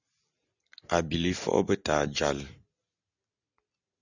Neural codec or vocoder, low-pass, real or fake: none; 7.2 kHz; real